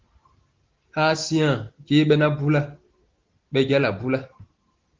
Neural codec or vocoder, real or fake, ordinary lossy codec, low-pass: none; real; Opus, 16 kbps; 7.2 kHz